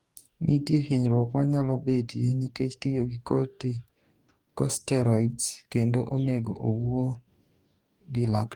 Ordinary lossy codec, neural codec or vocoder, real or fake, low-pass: Opus, 32 kbps; codec, 44.1 kHz, 2.6 kbps, DAC; fake; 19.8 kHz